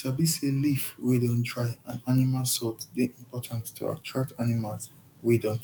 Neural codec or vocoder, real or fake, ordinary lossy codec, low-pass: codec, 44.1 kHz, 7.8 kbps, DAC; fake; none; 19.8 kHz